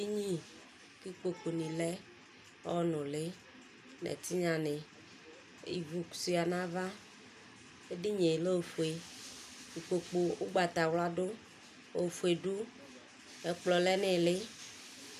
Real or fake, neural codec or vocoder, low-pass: real; none; 14.4 kHz